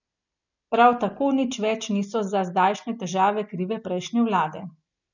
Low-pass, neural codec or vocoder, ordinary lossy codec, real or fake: 7.2 kHz; none; none; real